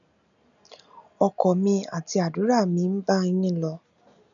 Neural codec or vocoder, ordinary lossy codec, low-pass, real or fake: none; none; 7.2 kHz; real